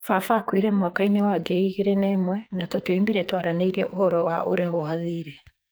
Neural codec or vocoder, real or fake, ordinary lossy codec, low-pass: codec, 44.1 kHz, 2.6 kbps, SNAC; fake; none; none